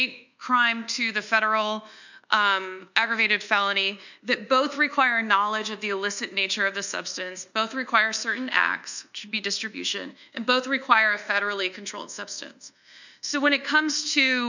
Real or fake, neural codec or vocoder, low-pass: fake; codec, 24 kHz, 1.2 kbps, DualCodec; 7.2 kHz